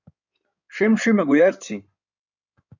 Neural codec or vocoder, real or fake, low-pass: codec, 16 kHz in and 24 kHz out, 2.2 kbps, FireRedTTS-2 codec; fake; 7.2 kHz